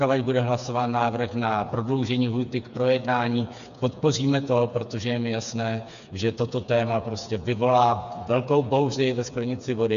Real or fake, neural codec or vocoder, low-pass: fake; codec, 16 kHz, 4 kbps, FreqCodec, smaller model; 7.2 kHz